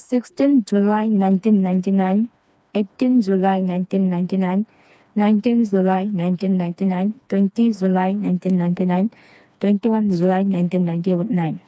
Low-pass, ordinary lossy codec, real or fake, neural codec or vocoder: none; none; fake; codec, 16 kHz, 2 kbps, FreqCodec, smaller model